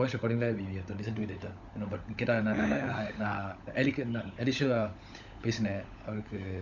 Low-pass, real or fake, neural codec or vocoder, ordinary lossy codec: 7.2 kHz; fake; codec, 16 kHz, 16 kbps, FunCodec, trained on LibriTTS, 50 frames a second; none